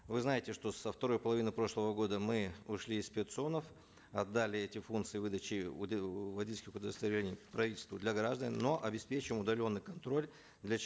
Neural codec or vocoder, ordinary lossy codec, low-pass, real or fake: none; none; none; real